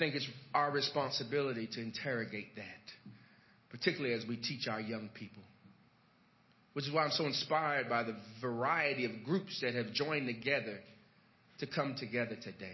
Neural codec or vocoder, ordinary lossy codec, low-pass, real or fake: none; MP3, 24 kbps; 7.2 kHz; real